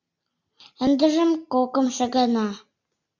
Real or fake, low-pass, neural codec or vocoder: real; 7.2 kHz; none